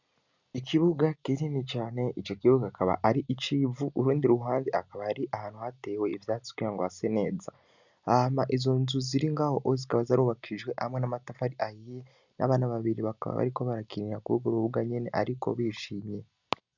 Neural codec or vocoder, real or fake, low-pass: none; real; 7.2 kHz